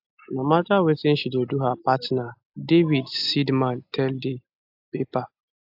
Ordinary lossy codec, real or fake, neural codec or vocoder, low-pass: AAC, 48 kbps; real; none; 5.4 kHz